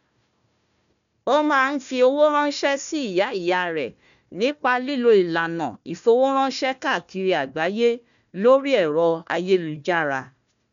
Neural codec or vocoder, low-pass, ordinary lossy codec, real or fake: codec, 16 kHz, 1 kbps, FunCodec, trained on Chinese and English, 50 frames a second; 7.2 kHz; none; fake